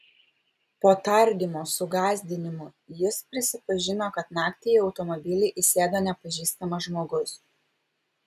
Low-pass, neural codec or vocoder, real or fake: 14.4 kHz; none; real